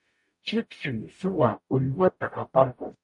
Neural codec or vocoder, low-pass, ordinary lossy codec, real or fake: codec, 44.1 kHz, 0.9 kbps, DAC; 10.8 kHz; MP3, 48 kbps; fake